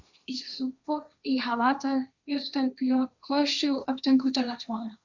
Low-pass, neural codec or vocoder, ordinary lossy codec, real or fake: 7.2 kHz; codec, 16 kHz, 1.1 kbps, Voila-Tokenizer; Opus, 64 kbps; fake